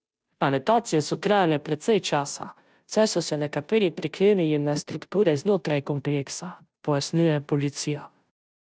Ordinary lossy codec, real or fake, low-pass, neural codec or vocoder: none; fake; none; codec, 16 kHz, 0.5 kbps, FunCodec, trained on Chinese and English, 25 frames a second